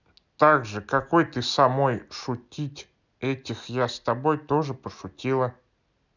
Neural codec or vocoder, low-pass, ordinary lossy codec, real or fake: none; 7.2 kHz; none; real